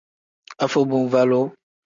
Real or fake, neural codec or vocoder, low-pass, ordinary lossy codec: real; none; 7.2 kHz; MP3, 64 kbps